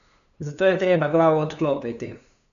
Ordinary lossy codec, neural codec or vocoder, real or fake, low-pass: none; codec, 16 kHz, 2 kbps, FunCodec, trained on LibriTTS, 25 frames a second; fake; 7.2 kHz